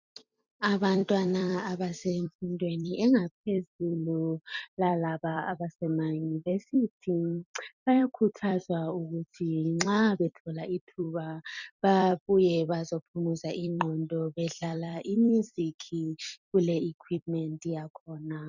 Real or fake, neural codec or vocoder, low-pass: fake; vocoder, 44.1 kHz, 128 mel bands every 256 samples, BigVGAN v2; 7.2 kHz